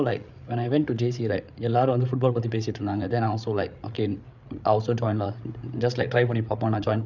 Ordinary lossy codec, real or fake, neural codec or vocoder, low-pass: none; fake; codec, 16 kHz, 8 kbps, FreqCodec, larger model; 7.2 kHz